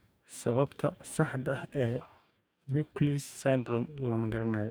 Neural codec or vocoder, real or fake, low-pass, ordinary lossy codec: codec, 44.1 kHz, 2.6 kbps, DAC; fake; none; none